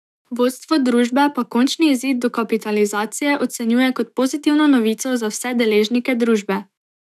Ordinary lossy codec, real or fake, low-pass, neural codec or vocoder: none; fake; 14.4 kHz; autoencoder, 48 kHz, 128 numbers a frame, DAC-VAE, trained on Japanese speech